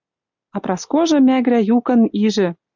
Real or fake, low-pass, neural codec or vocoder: real; 7.2 kHz; none